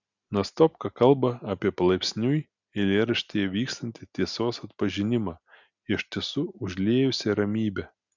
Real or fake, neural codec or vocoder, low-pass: real; none; 7.2 kHz